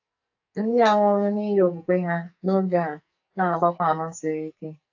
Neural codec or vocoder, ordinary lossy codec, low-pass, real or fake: codec, 44.1 kHz, 2.6 kbps, SNAC; AAC, 48 kbps; 7.2 kHz; fake